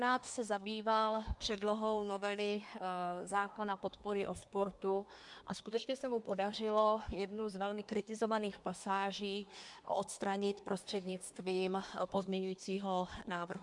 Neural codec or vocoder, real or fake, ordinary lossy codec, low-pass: codec, 24 kHz, 1 kbps, SNAC; fake; MP3, 64 kbps; 10.8 kHz